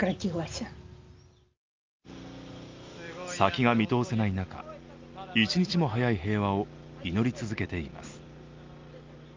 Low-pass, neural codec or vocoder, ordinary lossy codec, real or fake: 7.2 kHz; none; Opus, 32 kbps; real